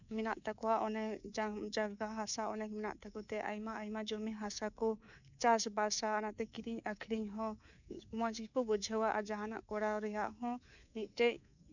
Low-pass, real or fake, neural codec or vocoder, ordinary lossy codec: 7.2 kHz; fake; codec, 24 kHz, 3.1 kbps, DualCodec; none